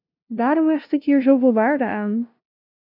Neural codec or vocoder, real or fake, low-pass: codec, 16 kHz, 0.5 kbps, FunCodec, trained on LibriTTS, 25 frames a second; fake; 5.4 kHz